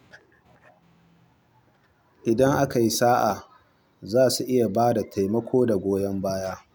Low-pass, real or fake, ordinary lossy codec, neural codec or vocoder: none; real; none; none